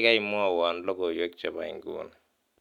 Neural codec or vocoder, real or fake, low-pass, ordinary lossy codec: none; real; 19.8 kHz; none